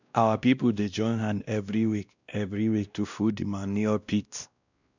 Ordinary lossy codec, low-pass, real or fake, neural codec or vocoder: none; 7.2 kHz; fake; codec, 16 kHz, 1 kbps, X-Codec, WavLM features, trained on Multilingual LibriSpeech